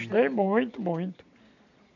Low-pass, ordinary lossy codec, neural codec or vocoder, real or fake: 7.2 kHz; none; none; real